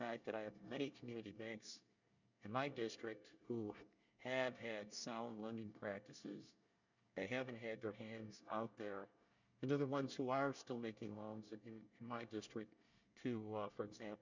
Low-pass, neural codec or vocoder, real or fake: 7.2 kHz; codec, 24 kHz, 1 kbps, SNAC; fake